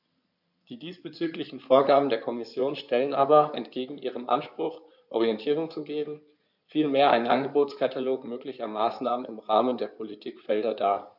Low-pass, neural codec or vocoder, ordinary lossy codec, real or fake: 5.4 kHz; codec, 16 kHz in and 24 kHz out, 2.2 kbps, FireRedTTS-2 codec; none; fake